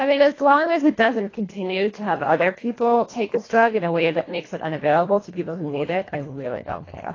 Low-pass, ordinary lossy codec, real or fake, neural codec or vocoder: 7.2 kHz; AAC, 32 kbps; fake; codec, 24 kHz, 1.5 kbps, HILCodec